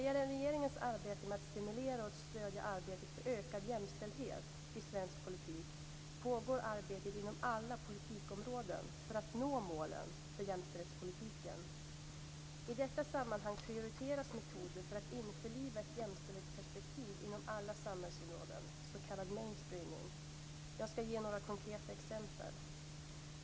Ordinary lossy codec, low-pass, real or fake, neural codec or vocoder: none; none; real; none